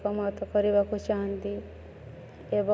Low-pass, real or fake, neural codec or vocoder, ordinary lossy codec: none; real; none; none